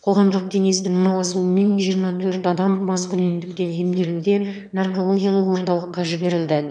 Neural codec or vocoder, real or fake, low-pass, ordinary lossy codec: autoencoder, 22.05 kHz, a latent of 192 numbers a frame, VITS, trained on one speaker; fake; 9.9 kHz; none